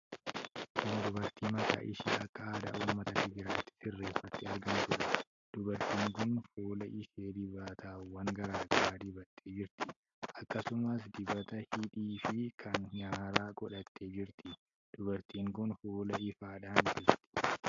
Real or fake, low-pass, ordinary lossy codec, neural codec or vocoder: real; 7.2 kHz; AAC, 64 kbps; none